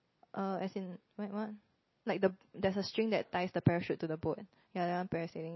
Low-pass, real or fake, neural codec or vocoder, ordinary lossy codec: 7.2 kHz; real; none; MP3, 24 kbps